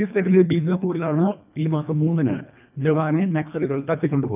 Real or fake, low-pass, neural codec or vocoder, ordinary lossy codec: fake; 3.6 kHz; codec, 24 kHz, 1.5 kbps, HILCodec; none